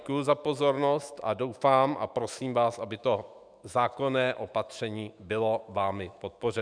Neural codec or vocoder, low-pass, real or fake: autoencoder, 48 kHz, 128 numbers a frame, DAC-VAE, trained on Japanese speech; 9.9 kHz; fake